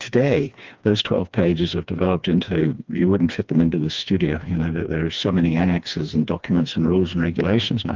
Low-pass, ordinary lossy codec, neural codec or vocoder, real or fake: 7.2 kHz; Opus, 32 kbps; codec, 16 kHz, 2 kbps, FreqCodec, smaller model; fake